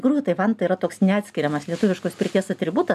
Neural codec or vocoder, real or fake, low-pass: none; real; 14.4 kHz